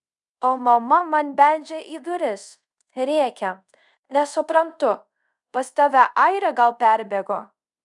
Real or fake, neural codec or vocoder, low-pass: fake; codec, 24 kHz, 0.5 kbps, DualCodec; 10.8 kHz